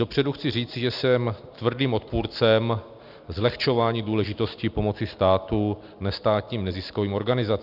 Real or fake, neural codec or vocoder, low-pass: real; none; 5.4 kHz